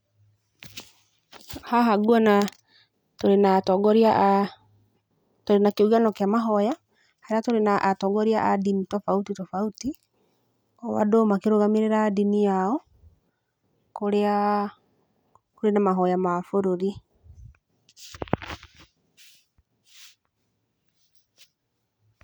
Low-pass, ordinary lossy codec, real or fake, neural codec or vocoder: none; none; real; none